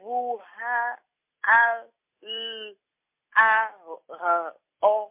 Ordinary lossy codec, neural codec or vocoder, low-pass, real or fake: MP3, 24 kbps; none; 3.6 kHz; real